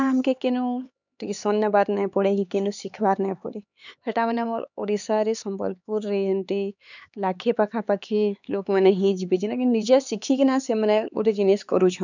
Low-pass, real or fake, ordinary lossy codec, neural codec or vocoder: 7.2 kHz; fake; none; codec, 16 kHz, 4 kbps, X-Codec, HuBERT features, trained on LibriSpeech